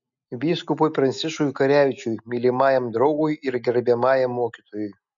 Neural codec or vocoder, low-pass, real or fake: none; 7.2 kHz; real